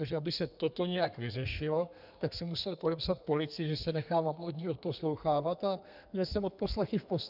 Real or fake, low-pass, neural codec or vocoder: fake; 5.4 kHz; codec, 32 kHz, 1.9 kbps, SNAC